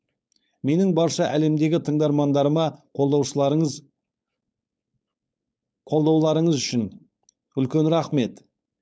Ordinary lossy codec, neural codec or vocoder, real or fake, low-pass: none; codec, 16 kHz, 4.8 kbps, FACodec; fake; none